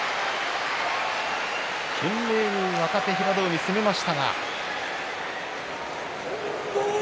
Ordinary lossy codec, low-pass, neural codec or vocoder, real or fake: none; none; none; real